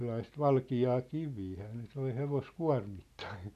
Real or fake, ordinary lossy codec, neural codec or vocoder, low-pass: real; none; none; 14.4 kHz